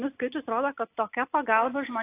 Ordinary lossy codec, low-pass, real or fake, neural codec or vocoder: AAC, 24 kbps; 3.6 kHz; real; none